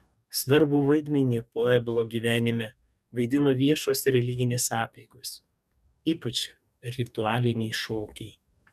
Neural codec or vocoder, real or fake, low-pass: codec, 44.1 kHz, 2.6 kbps, DAC; fake; 14.4 kHz